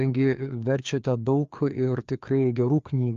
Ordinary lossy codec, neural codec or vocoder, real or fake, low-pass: Opus, 24 kbps; codec, 16 kHz, 2 kbps, FreqCodec, larger model; fake; 7.2 kHz